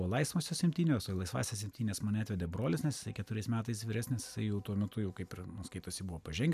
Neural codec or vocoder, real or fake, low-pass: none; real; 14.4 kHz